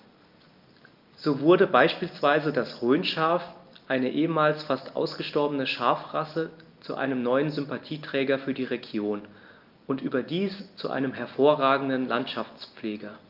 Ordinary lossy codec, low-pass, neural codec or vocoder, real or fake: Opus, 24 kbps; 5.4 kHz; none; real